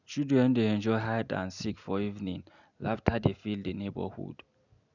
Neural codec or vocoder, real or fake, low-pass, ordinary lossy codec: none; real; 7.2 kHz; none